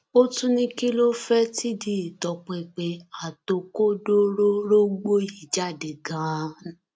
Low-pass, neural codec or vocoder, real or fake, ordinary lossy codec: none; none; real; none